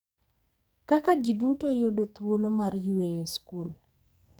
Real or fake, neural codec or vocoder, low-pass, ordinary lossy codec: fake; codec, 44.1 kHz, 2.6 kbps, SNAC; none; none